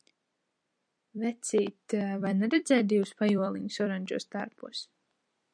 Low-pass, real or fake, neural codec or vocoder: 9.9 kHz; fake; vocoder, 44.1 kHz, 128 mel bands every 256 samples, BigVGAN v2